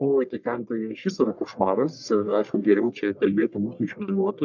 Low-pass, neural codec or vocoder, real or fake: 7.2 kHz; codec, 44.1 kHz, 1.7 kbps, Pupu-Codec; fake